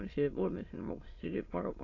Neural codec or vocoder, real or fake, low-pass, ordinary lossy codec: autoencoder, 22.05 kHz, a latent of 192 numbers a frame, VITS, trained on many speakers; fake; 7.2 kHz; AAC, 32 kbps